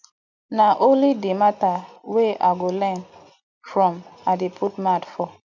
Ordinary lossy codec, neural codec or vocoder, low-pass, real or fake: none; none; 7.2 kHz; real